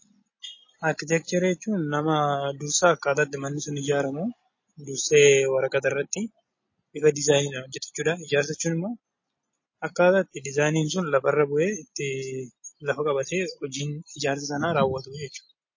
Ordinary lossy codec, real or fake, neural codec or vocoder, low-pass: MP3, 32 kbps; real; none; 7.2 kHz